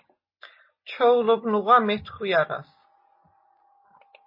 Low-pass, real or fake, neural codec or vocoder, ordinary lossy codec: 5.4 kHz; real; none; MP3, 24 kbps